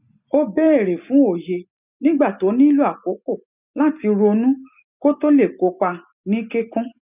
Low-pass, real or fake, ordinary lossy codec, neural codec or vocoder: 3.6 kHz; real; none; none